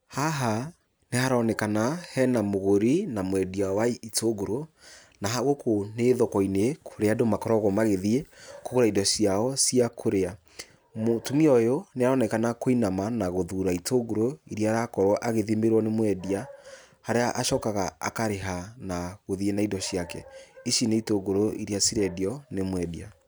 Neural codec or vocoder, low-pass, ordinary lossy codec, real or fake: none; none; none; real